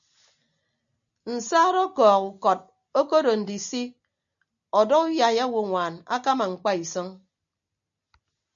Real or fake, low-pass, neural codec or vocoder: real; 7.2 kHz; none